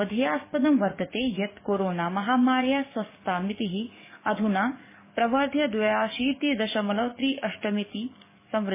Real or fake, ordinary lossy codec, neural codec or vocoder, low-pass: fake; MP3, 16 kbps; codec, 44.1 kHz, 7.8 kbps, DAC; 3.6 kHz